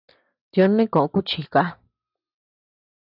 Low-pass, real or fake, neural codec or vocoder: 5.4 kHz; real; none